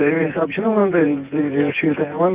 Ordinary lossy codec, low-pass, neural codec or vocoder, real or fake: Opus, 24 kbps; 3.6 kHz; vocoder, 24 kHz, 100 mel bands, Vocos; fake